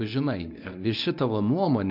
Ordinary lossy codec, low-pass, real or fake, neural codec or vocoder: MP3, 48 kbps; 5.4 kHz; fake; codec, 24 kHz, 0.9 kbps, WavTokenizer, medium speech release version 1